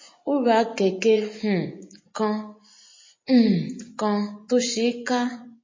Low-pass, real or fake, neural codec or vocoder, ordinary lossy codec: 7.2 kHz; fake; vocoder, 24 kHz, 100 mel bands, Vocos; MP3, 32 kbps